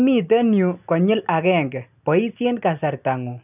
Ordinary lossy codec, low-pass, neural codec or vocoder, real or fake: none; 3.6 kHz; none; real